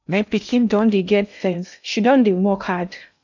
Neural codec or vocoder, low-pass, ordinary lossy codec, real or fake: codec, 16 kHz in and 24 kHz out, 0.6 kbps, FocalCodec, streaming, 2048 codes; 7.2 kHz; none; fake